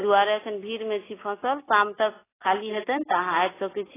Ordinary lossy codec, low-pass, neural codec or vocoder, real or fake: AAC, 16 kbps; 3.6 kHz; none; real